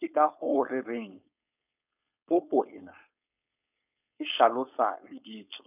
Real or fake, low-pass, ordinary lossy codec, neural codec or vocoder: fake; 3.6 kHz; none; codec, 16 kHz, 4.8 kbps, FACodec